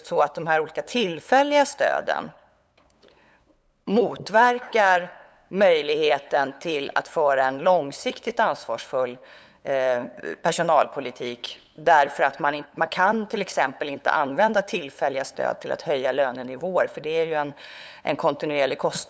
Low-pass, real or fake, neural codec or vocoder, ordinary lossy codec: none; fake; codec, 16 kHz, 8 kbps, FunCodec, trained on LibriTTS, 25 frames a second; none